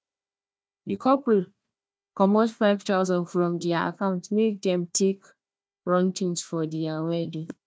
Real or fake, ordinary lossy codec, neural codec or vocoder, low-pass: fake; none; codec, 16 kHz, 1 kbps, FunCodec, trained on Chinese and English, 50 frames a second; none